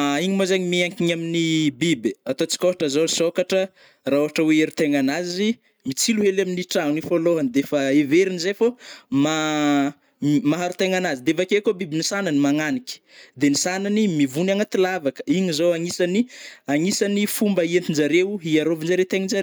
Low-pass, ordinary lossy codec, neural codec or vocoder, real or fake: none; none; none; real